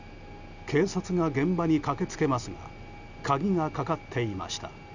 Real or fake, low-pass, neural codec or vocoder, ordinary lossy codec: real; 7.2 kHz; none; none